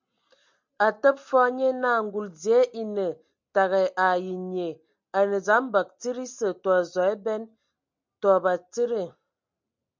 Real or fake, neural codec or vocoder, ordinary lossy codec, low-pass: real; none; MP3, 64 kbps; 7.2 kHz